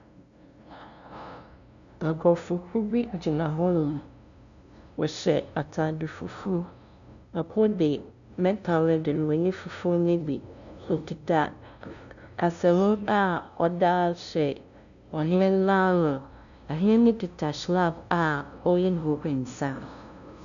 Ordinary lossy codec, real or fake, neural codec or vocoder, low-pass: MP3, 96 kbps; fake; codec, 16 kHz, 0.5 kbps, FunCodec, trained on LibriTTS, 25 frames a second; 7.2 kHz